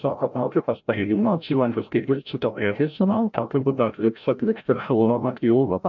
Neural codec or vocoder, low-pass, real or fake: codec, 16 kHz, 0.5 kbps, FreqCodec, larger model; 7.2 kHz; fake